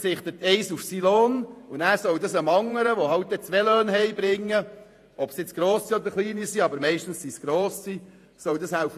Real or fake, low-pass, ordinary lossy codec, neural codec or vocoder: fake; 14.4 kHz; AAC, 64 kbps; vocoder, 48 kHz, 128 mel bands, Vocos